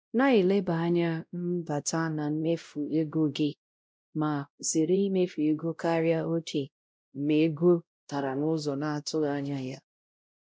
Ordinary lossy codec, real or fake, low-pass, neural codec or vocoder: none; fake; none; codec, 16 kHz, 0.5 kbps, X-Codec, WavLM features, trained on Multilingual LibriSpeech